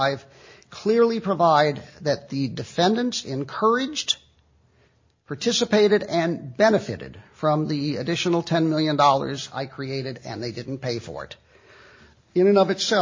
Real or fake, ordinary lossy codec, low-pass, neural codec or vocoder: real; MP3, 32 kbps; 7.2 kHz; none